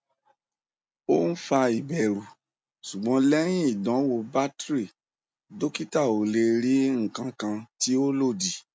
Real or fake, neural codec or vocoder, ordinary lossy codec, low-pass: real; none; none; none